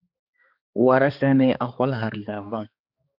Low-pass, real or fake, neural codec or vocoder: 5.4 kHz; fake; codec, 16 kHz, 2 kbps, X-Codec, HuBERT features, trained on balanced general audio